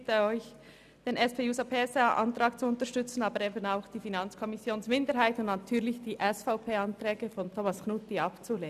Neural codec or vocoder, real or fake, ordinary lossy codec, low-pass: none; real; none; 14.4 kHz